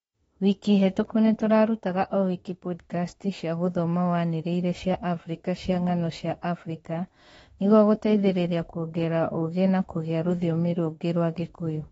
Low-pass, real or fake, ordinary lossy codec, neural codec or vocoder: 19.8 kHz; fake; AAC, 24 kbps; autoencoder, 48 kHz, 32 numbers a frame, DAC-VAE, trained on Japanese speech